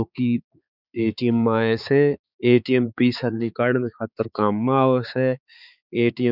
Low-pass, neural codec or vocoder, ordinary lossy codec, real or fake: 5.4 kHz; codec, 16 kHz, 4 kbps, X-Codec, HuBERT features, trained on balanced general audio; none; fake